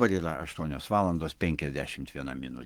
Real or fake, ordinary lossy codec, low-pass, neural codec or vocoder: fake; Opus, 24 kbps; 19.8 kHz; codec, 44.1 kHz, 7.8 kbps, Pupu-Codec